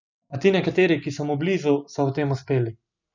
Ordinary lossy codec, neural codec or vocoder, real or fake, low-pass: none; none; real; 7.2 kHz